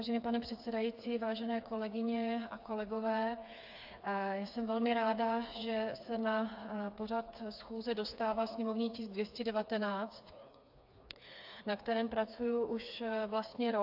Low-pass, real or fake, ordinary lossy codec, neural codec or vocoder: 5.4 kHz; fake; AAC, 48 kbps; codec, 16 kHz, 4 kbps, FreqCodec, smaller model